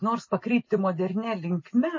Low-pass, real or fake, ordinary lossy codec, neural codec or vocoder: 7.2 kHz; real; MP3, 32 kbps; none